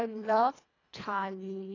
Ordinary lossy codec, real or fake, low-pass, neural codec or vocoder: AAC, 32 kbps; fake; 7.2 kHz; codec, 24 kHz, 1.5 kbps, HILCodec